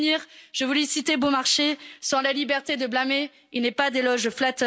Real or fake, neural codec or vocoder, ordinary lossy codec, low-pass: real; none; none; none